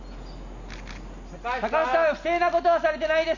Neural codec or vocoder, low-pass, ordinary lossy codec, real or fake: none; 7.2 kHz; none; real